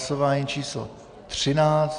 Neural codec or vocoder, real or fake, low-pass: none; real; 9.9 kHz